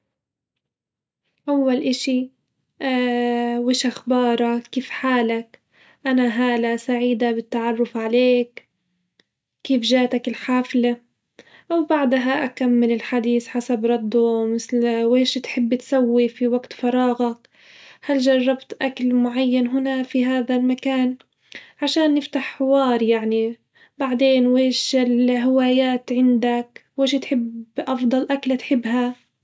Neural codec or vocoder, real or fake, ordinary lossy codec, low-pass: none; real; none; none